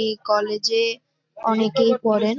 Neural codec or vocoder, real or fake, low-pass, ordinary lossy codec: none; real; 7.2 kHz; MP3, 48 kbps